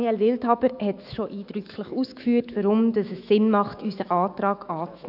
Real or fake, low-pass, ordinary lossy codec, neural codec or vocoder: fake; 5.4 kHz; AAC, 48 kbps; autoencoder, 48 kHz, 128 numbers a frame, DAC-VAE, trained on Japanese speech